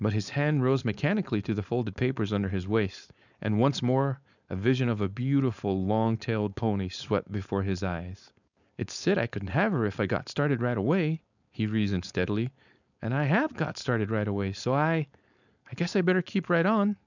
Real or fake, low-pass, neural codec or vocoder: fake; 7.2 kHz; codec, 16 kHz, 4.8 kbps, FACodec